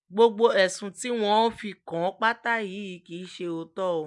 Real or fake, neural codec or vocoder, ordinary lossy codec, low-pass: real; none; none; 10.8 kHz